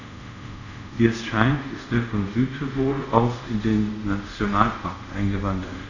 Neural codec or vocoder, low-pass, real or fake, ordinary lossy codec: codec, 24 kHz, 0.5 kbps, DualCodec; 7.2 kHz; fake; none